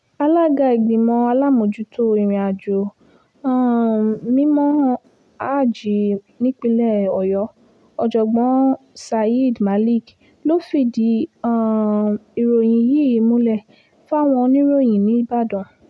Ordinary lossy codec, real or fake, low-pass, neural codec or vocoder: none; real; none; none